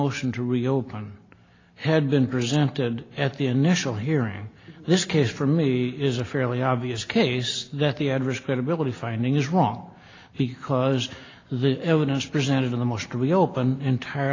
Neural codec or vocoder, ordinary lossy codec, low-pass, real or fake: none; AAC, 32 kbps; 7.2 kHz; real